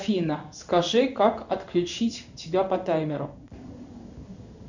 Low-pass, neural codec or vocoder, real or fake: 7.2 kHz; codec, 16 kHz in and 24 kHz out, 1 kbps, XY-Tokenizer; fake